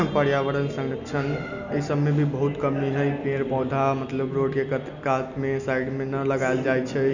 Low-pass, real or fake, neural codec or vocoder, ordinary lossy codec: 7.2 kHz; real; none; none